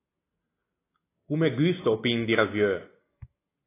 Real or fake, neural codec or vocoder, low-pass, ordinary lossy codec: real; none; 3.6 kHz; AAC, 16 kbps